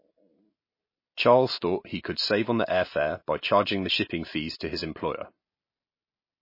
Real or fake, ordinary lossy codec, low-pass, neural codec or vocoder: real; MP3, 24 kbps; 5.4 kHz; none